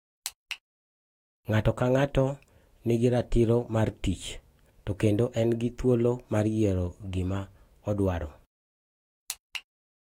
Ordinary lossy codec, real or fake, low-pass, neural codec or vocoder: AAC, 48 kbps; fake; 19.8 kHz; autoencoder, 48 kHz, 128 numbers a frame, DAC-VAE, trained on Japanese speech